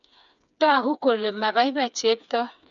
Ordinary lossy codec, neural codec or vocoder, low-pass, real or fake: none; codec, 16 kHz, 4 kbps, FreqCodec, smaller model; 7.2 kHz; fake